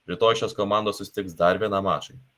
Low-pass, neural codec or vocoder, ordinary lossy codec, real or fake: 14.4 kHz; none; Opus, 24 kbps; real